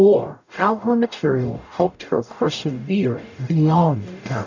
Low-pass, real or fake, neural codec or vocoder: 7.2 kHz; fake; codec, 44.1 kHz, 0.9 kbps, DAC